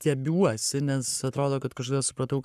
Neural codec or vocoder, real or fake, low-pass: codec, 44.1 kHz, 7.8 kbps, Pupu-Codec; fake; 14.4 kHz